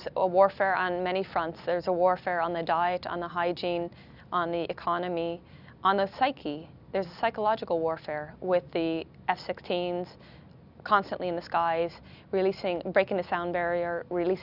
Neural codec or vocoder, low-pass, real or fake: none; 5.4 kHz; real